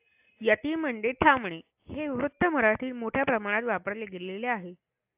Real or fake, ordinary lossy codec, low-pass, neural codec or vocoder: real; AAC, 32 kbps; 3.6 kHz; none